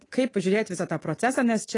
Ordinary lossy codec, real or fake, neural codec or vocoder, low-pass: AAC, 32 kbps; fake; codec, 24 kHz, 3.1 kbps, DualCodec; 10.8 kHz